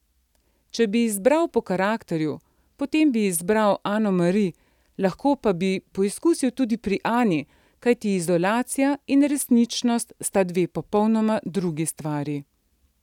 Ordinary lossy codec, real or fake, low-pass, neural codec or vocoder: none; real; 19.8 kHz; none